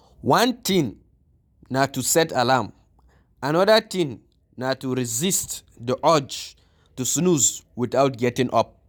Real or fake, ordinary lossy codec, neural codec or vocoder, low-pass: real; none; none; none